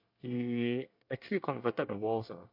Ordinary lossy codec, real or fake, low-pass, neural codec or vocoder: MP3, 48 kbps; fake; 5.4 kHz; codec, 24 kHz, 1 kbps, SNAC